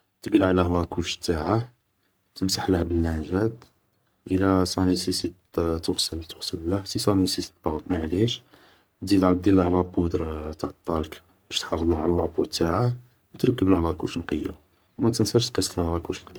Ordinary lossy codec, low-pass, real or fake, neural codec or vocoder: none; none; fake; codec, 44.1 kHz, 3.4 kbps, Pupu-Codec